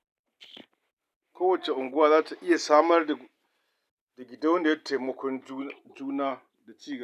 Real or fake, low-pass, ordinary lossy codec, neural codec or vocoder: real; 14.4 kHz; none; none